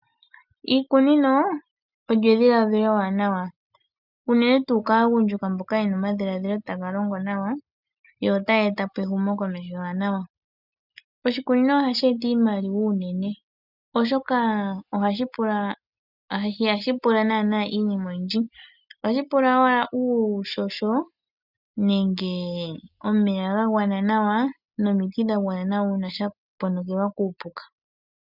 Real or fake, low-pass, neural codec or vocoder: real; 5.4 kHz; none